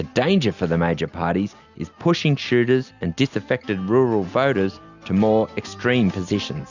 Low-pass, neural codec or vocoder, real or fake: 7.2 kHz; none; real